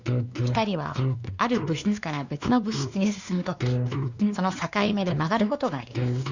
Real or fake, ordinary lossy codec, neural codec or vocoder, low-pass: fake; Opus, 64 kbps; codec, 16 kHz, 2 kbps, X-Codec, WavLM features, trained on Multilingual LibriSpeech; 7.2 kHz